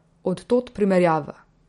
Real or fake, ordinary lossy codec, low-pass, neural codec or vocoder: real; MP3, 48 kbps; 10.8 kHz; none